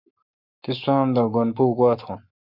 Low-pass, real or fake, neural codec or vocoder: 5.4 kHz; fake; codec, 44.1 kHz, 7.8 kbps, Pupu-Codec